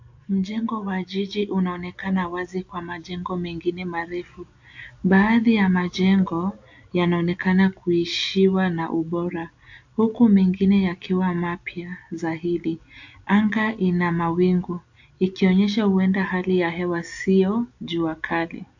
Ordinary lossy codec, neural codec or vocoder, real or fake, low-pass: AAC, 48 kbps; none; real; 7.2 kHz